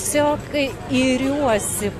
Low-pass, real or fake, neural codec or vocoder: 14.4 kHz; real; none